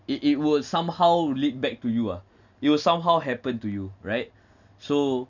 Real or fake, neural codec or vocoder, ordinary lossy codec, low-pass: real; none; none; 7.2 kHz